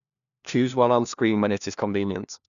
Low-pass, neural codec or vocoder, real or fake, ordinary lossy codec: 7.2 kHz; codec, 16 kHz, 1 kbps, FunCodec, trained on LibriTTS, 50 frames a second; fake; none